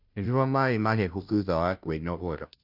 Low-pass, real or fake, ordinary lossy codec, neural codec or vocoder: 5.4 kHz; fake; none; codec, 16 kHz, 0.5 kbps, FunCodec, trained on Chinese and English, 25 frames a second